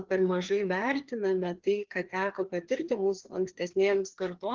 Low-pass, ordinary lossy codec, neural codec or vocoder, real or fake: 7.2 kHz; Opus, 16 kbps; codec, 16 kHz, 2 kbps, FreqCodec, larger model; fake